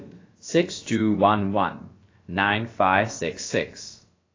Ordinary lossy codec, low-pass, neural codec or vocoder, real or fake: AAC, 32 kbps; 7.2 kHz; codec, 16 kHz, about 1 kbps, DyCAST, with the encoder's durations; fake